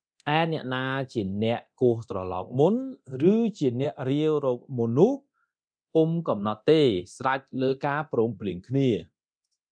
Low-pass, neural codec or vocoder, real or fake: 9.9 kHz; codec, 24 kHz, 0.9 kbps, DualCodec; fake